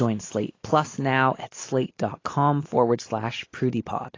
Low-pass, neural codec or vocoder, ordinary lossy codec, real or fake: 7.2 kHz; none; AAC, 32 kbps; real